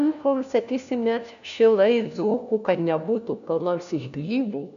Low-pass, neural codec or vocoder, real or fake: 7.2 kHz; codec, 16 kHz, 1 kbps, FunCodec, trained on LibriTTS, 50 frames a second; fake